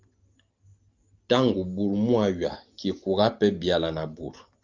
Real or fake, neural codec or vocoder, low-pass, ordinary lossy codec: real; none; 7.2 kHz; Opus, 24 kbps